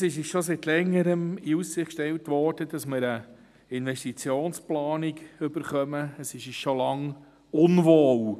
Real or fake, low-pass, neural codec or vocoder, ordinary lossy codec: real; 14.4 kHz; none; none